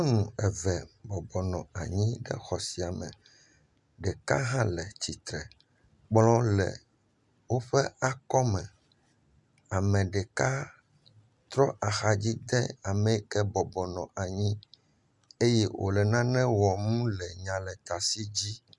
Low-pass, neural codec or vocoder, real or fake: 10.8 kHz; none; real